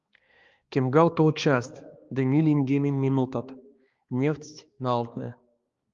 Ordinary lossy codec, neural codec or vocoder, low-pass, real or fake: Opus, 32 kbps; codec, 16 kHz, 2 kbps, X-Codec, HuBERT features, trained on balanced general audio; 7.2 kHz; fake